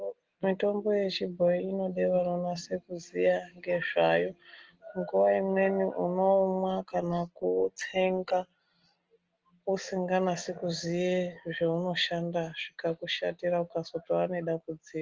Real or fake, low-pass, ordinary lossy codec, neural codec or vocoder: real; 7.2 kHz; Opus, 16 kbps; none